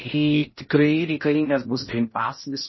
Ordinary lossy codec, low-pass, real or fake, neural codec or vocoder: MP3, 24 kbps; 7.2 kHz; fake; codec, 16 kHz in and 24 kHz out, 0.6 kbps, FocalCodec, streaming, 4096 codes